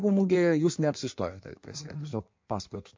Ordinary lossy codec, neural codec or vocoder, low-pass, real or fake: MP3, 48 kbps; codec, 16 kHz in and 24 kHz out, 1.1 kbps, FireRedTTS-2 codec; 7.2 kHz; fake